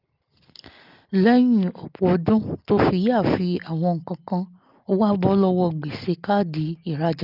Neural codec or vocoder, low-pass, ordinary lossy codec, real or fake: none; 5.4 kHz; Opus, 24 kbps; real